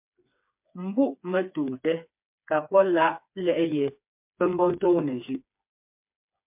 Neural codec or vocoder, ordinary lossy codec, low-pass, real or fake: codec, 16 kHz, 4 kbps, FreqCodec, smaller model; MP3, 32 kbps; 3.6 kHz; fake